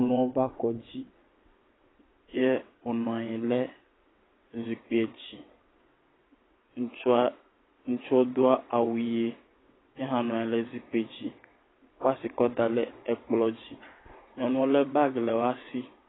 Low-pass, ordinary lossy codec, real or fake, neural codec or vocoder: 7.2 kHz; AAC, 16 kbps; fake; vocoder, 22.05 kHz, 80 mel bands, WaveNeXt